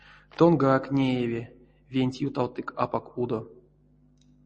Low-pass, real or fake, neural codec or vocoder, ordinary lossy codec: 10.8 kHz; real; none; MP3, 32 kbps